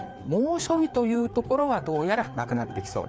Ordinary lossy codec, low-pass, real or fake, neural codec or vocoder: none; none; fake; codec, 16 kHz, 4 kbps, FreqCodec, larger model